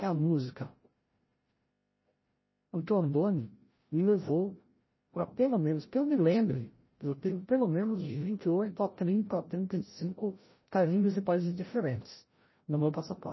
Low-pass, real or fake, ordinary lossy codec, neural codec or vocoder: 7.2 kHz; fake; MP3, 24 kbps; codec, 16 kHz, 0.5 kbps, FreqCodec, larger model